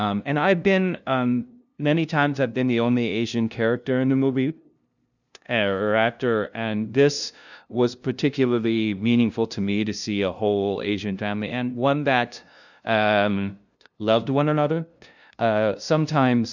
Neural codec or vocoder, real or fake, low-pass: codec, 16 kHz, 0.5 kbps, FunCodec, trained on LibriTTS, 25 frames a second; fake; 7.2 kHz